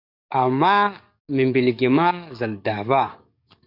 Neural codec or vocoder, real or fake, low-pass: codec, 44.1 kHz, 7.8 kbps, DAC; fake; 5.4 kHz